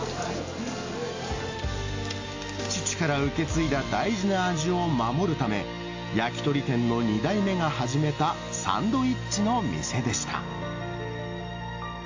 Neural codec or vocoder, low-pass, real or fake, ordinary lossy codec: none; 7.2 kHz; real; AAC, 48 kbps